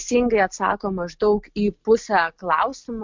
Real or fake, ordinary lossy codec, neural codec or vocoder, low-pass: real; MP3, 64 kbps; none; 7.2 kHz